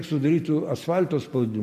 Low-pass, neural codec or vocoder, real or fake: 14.4 kHz; none; real